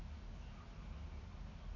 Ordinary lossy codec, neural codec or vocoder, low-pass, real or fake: none; none; 7.2 kHz; real